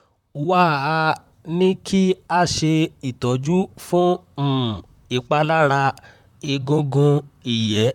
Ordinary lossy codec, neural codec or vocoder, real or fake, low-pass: none; vocoder, 44.1 kHz, 128 mel bands, Pupu-Vocoder; fake; 19.8 kHz